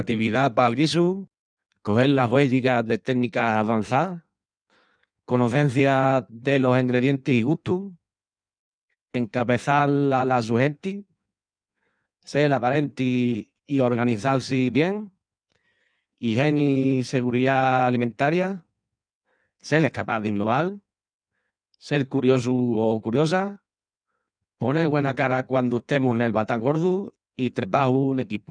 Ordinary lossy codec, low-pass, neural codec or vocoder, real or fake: none; 9.9 kHz; codec, 16 kHz in and 24 kHz out, 1.1 kbps, FireRedTTS-2 codec; fake